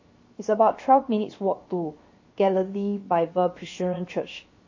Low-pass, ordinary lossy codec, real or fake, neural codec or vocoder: 7.2 kHz; MP3, 32 kbps; fake; codec, 16 kHz, 0.7 kbps, FocalCodec